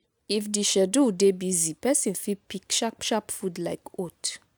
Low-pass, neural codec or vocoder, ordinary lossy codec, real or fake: none; none; none; real